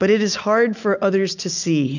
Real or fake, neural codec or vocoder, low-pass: real; none; 7.2 kHz